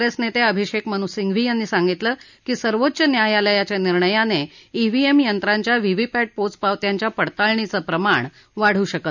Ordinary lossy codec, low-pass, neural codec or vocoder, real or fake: none; 7.2 kHz; none; real